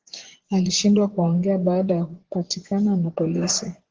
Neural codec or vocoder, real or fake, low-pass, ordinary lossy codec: vocoder, 24 kHz, 100 mel bands, Vocos; fake; 7.2 kHz; Opus, 16 kbps